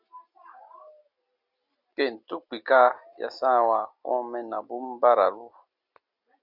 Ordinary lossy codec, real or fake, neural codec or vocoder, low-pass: Opus, 64 kbps; real; none; 5.4 kHz